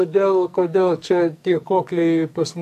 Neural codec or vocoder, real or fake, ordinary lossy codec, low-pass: codec, 44.1 kHz, 2.6 kbps, SNAC; fake; MP3, 96 kbps; 14.4 kHz